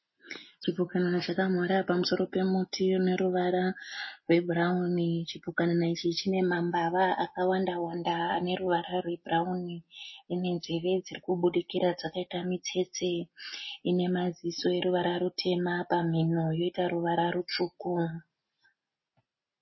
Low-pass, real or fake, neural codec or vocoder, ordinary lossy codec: 7.2 kHz; real; none; MP3, 24 kbps